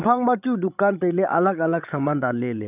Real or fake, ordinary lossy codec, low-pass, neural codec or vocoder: fake; none; 3.6 kHz; codec, 16 kHz, 16 kbps, FreqCodec, larger model